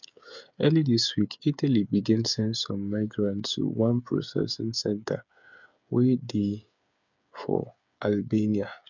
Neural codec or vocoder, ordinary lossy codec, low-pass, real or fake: codec, 16 kHz, 8 kbps, FreqCodec, smaller model; none; 7.2 kHz; fake